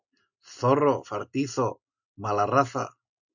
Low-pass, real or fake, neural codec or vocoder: 7.2 kHz; real; none